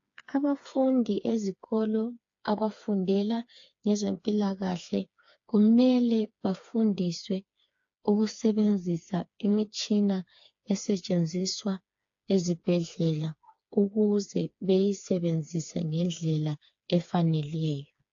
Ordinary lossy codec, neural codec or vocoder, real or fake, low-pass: AAC, 48 kbps; codec, 16 kHz, 4 kbps, FreqCodec, smaller model; fake; 7.2 kHz